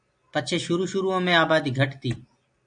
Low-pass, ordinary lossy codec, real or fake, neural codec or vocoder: 9.9 kHz; AAC, 64 kbps; real; none